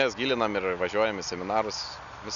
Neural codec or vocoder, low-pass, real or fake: none; 7.2 kHz; real